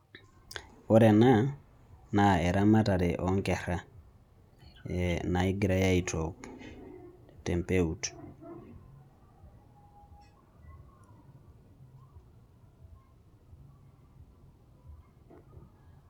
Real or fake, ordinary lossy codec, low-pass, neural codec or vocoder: real; none; 19.8 kHz; none